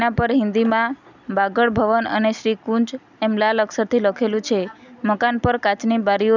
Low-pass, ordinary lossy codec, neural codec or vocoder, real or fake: 7.2 kHz; none; none; real